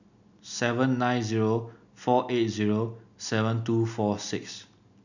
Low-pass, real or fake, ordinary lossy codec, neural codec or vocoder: 7.2 kHz; real; none; none